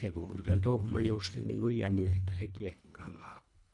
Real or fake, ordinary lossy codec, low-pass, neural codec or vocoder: fake; none; 10.8 kHz; codec, 24 kHz, 1.5 kbps, HILCodec